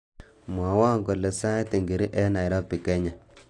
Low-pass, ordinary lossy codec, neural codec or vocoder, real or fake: 10.8 kHz; AAC, 48 kbps; none; real